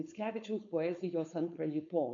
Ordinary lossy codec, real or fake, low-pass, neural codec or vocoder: MP3, 48 kbps; fake; 7.2 kHz; codec, 16 kHz, 4.8 kbps, FACodec